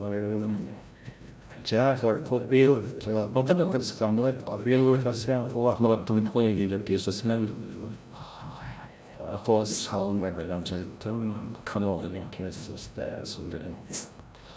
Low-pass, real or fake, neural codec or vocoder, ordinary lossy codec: none; fake; codec, 16 kHz, 0.5 kbps, FreqCodec, larger model; none